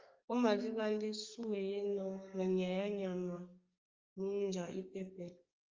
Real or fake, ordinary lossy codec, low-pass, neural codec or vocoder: fake; Opus, 32 kbps; 7.2 kHz; codec, 32 kHz, 1.9 kbps, SNAC